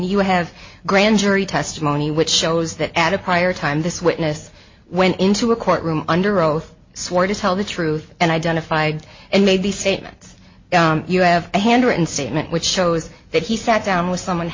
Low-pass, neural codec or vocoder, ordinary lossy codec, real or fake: 7.2 kHz; none; MP3, 32 kbps; real